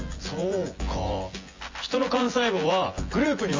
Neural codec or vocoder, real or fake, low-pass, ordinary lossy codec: vocoder, 24 kHz, 100 mel bands, Vocos; fake; 7.2 kHz; MP3, 32 kbps